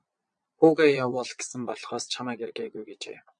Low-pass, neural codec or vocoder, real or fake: 9.9 kHz; vocoder, 22.05 kHz, 80 mel bands, Vocos; fake